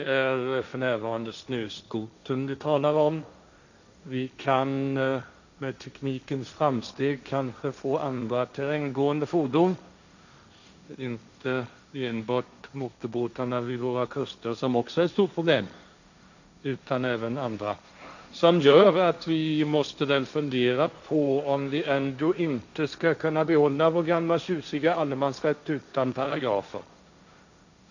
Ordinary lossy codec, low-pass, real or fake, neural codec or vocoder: none; 7.2 kHz; fake; codec, 16 kHz, 1.1 kbps, Voila-Tokenizer